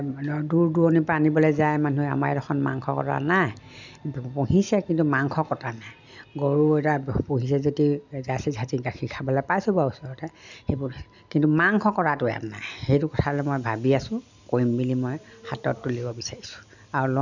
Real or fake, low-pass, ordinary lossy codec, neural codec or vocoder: real; 7.2 kHz; none; none